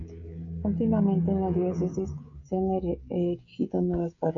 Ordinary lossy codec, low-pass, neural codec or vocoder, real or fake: AAC, 64 kbps; 7.2 kHz; codec, 16 kHz, 16 kbps, FreqCodec, smaller model; fake